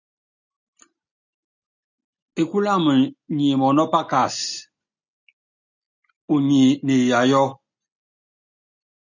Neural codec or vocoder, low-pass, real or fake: none; 7.2 kHz; real